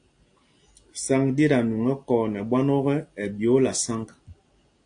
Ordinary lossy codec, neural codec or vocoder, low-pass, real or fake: AAC, 48 kbps; none; 9.9 kHz; real